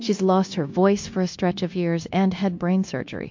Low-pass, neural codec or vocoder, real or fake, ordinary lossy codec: 7.2 kHz; codec, 16 kHz, 0.9 kbps, LongCat-Audio-Codec; fake; MP3, 48 kbps